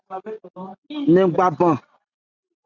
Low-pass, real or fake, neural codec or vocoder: 7.2 kHz; real; none